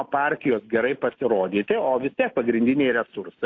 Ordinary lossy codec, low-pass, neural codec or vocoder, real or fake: AAC, 48 kbps; 7.2 kHz; none; real